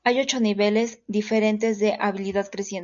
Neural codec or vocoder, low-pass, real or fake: none; 7.2 kHz; real